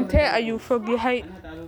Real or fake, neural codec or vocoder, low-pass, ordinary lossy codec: real; none; none; none